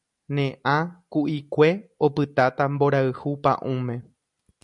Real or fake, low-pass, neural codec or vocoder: real; 10.8 kHz; none